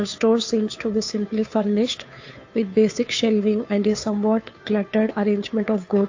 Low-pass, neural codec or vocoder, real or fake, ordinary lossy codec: 7.2 kHz; codec, 16 kHz, 2 kbps, FunCodec, trained on Chinese and English, 25 frames a second; fake; AAC, 48 kbps